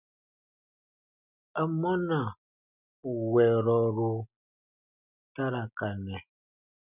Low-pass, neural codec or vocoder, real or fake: 3.6 kHz; none; real